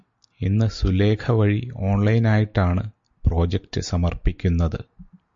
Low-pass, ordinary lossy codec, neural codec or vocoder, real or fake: 7.2 kHz; MP3, 48 kbps; none; real